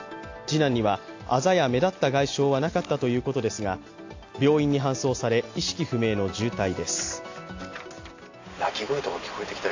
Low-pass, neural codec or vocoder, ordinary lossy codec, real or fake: 7.2 kHz; none; AAC, 48 kbps; real